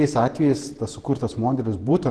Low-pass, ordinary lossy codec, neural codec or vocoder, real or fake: 10.8 kHz; Opus, 16 kbps; vocoder, 48 kHz, 128 mel bands, Vocos; fake